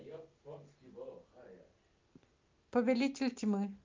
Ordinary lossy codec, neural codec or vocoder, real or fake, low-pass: Opus, 24 kbps; none; real; 7.2 kHz